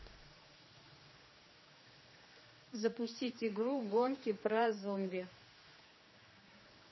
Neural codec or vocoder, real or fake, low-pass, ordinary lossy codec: codec, 16 kHz, 2 kbps, X-Codec, HuBERT features, trained on general audio; fake; 7.2 kHz; MP3, 24 kbps